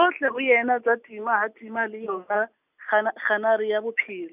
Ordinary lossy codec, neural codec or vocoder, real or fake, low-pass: none; none; real; 3.6 kHz